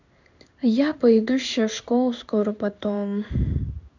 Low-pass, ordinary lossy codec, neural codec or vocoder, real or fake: 7.2 kHz; none; codec, 16 kHz in and 24 kHz out, 1 kbps, XY-Tokenizer; fake